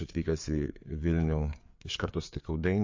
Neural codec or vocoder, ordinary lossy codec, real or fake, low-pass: codec, 16 kHz, 4 kbps, FreqCodec, larger model; MP3, 48 kbps; fake; 7.2 kHz